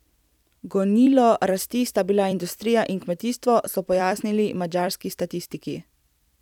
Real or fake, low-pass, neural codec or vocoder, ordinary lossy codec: fake; 19.8 kHz; vocoder, 44.1 kHz, 128 mel bands every 256 samples, BigVGAN v2; none